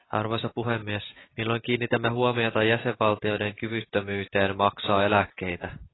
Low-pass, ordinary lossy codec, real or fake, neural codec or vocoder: 7.2 kHz; AAC, 16 kbps; real; none